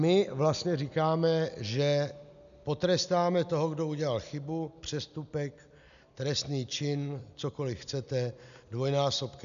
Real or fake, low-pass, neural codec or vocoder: real; 7.2 kHz; none